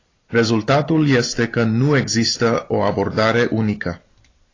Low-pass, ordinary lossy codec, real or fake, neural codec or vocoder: 7.2 kHz; AAC, 32 kbps; real; none